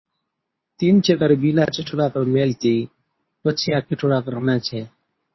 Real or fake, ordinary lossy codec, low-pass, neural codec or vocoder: fake; MP3, 24 kbps; 7.2 kHz; codec, 24 kHz, 0.9 kbps, WavTokenizer, medium speech release version 2